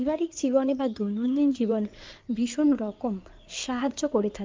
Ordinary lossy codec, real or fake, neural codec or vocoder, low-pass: Opus, 32 kbps; fake; codec, 16 kHz in and 24 kHz out, 2.2 kbps, FireRedTTS-2 codec; 7.2 kHz